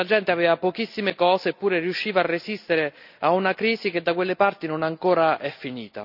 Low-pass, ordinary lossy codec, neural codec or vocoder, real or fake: 5.4 kHz; none; none; real